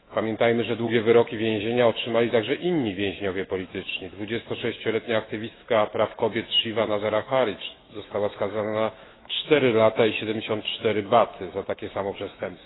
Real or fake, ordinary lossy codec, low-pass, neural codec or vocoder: fake; AAC, 16 kbps; 7.2 kHz; autoencoder, 48 kHz, 128 numbers a frame, DAC-VAE, trained on Japanese speech